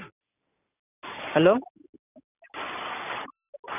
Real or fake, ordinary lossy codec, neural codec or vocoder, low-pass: real; none; none; 3.6 kHz